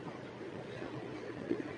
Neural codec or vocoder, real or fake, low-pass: vocoder, 22.05 kHz, 80 mel bands, Vocos; fake; 9.9 kHz